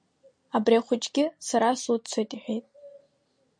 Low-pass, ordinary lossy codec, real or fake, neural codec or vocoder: 9.9 kHz; MP3, 48 kbps; real; none